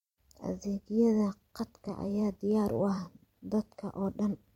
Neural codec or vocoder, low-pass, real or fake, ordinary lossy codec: vocoder, 44.1 kHz, 128 mel bands every 256 samples, BigVGAN v2; 19.8 kHz; fake; MP3, 64 kbps